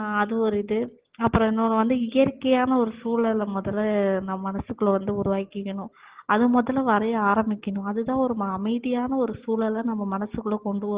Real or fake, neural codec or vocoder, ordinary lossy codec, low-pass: real; none; Opus, 16 kbps; 3.6 kHz